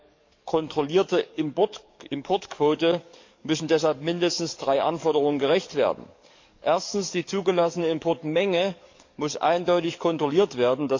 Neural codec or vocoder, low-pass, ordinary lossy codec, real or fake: codec, 44.1 kHz, 7.8 kbps, DAC; 7.2 kHz; MP3, 48 kbps; fake